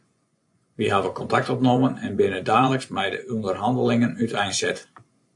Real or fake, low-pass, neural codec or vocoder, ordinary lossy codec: fake; 10.8 kHz; vocoder, 24 kHz, 100 mel bands, Vocos; AAC, 64 kbps